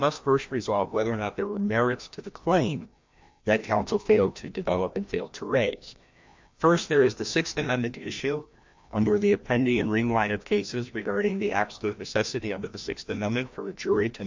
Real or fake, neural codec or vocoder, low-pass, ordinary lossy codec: fake; codec, 16 kHz, 1 kbps, FreqCodec, larger model; 7.2 kHz; MP3, 48 kbps